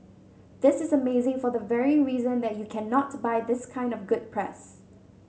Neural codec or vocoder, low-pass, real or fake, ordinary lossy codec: none; none; real; none